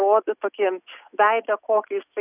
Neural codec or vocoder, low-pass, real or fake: none; 3.6 kHz; real